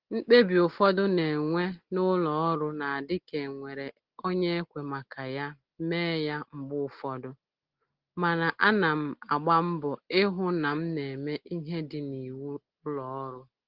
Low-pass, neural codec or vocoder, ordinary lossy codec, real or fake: 5.4 kHz; none; Opus, 16 kbps; real